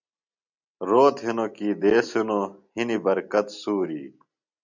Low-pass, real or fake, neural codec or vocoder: 7.2 kHz; real; none